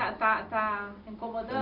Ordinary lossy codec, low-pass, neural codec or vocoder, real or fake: AAC, 48 kbps; 5.4 kHz; none; real